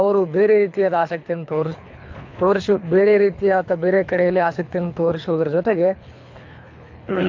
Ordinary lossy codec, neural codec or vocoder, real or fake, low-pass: AAC, 48 kbps; codec, 24 kHz, 3 kbps, HILCodec; fake; 7.2 kHz